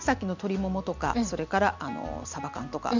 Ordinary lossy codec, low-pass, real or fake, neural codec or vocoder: none; 7.2 kHz; real; none